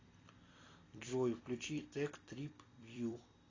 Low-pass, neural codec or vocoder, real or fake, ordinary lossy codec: 7.2 kHz; vocoder, 44.1 kHz, 128 mel bands every 256 samples, BigVGAN v2; fake; AAC, 32 kbps